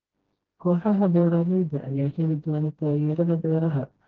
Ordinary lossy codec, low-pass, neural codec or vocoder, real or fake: Opus, 16 kbps; 7.2 kHz; codec, 16 kHz, 1 kbps, FreqCodec, smaller model; fake